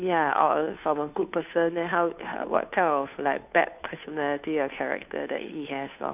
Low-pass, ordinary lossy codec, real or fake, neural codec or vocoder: 3.6 kHz; none; fake; codec, 16 kHz, 2 kbps, FunCodec, trained on Chinese and English, 25 frames a second